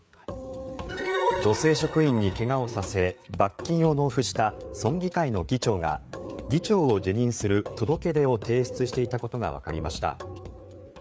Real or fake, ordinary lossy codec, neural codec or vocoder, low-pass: fake; none; codec, 16 kHz, 4 kbps, FreqCodec, larger model; none